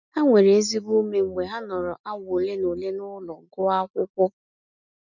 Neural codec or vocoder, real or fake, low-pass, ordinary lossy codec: none; real; 7.2 kHz; none